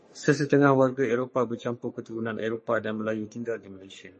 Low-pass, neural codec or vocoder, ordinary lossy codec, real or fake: 10.8 kHz; codec, 44.1 kHz, 3.4 kbps, Pupu-Codec; MP3, 32 kbps; fake